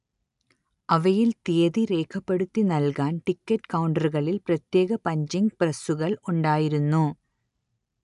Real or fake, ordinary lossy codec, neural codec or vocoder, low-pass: real; none; none; 10.8 kHz